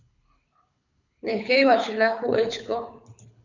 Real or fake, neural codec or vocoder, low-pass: fake; codec, 24 kHz, 6 kbps, HILCodec; 7.2 kHz